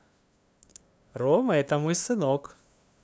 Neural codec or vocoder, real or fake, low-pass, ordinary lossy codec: codec, 16 kHz, 2 kbps, FunCodec, trained on LibriTTS, 25 frames a second; fake; none; none